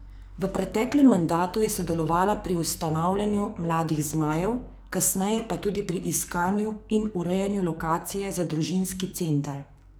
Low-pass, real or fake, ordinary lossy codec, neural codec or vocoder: none; fake; none; codec, 44.1 kHz, 2.6 kbps, SNAC